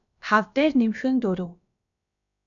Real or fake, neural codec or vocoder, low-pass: fake; codec, 16 kHz, about 1 kbps, DyCAST, with the encoder's durations; 7.2 kHz